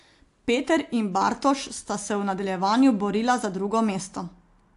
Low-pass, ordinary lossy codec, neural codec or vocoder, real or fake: 10.8 kHz; AAC, 64 kbps; none; real